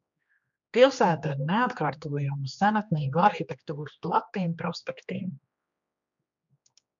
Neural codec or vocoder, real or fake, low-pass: codec, 16 kHz, 2 kbps, X-Codec, HuBERT features, trained on general audio; fake; 7.2 kHz